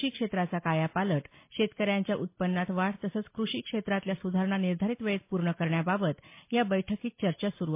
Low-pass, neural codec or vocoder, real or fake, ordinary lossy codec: 3.6 kHz; none; real; MP3, 24 kbps